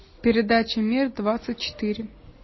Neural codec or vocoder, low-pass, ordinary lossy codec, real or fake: none; 7.2 kHz; MP3, 24 kbps; real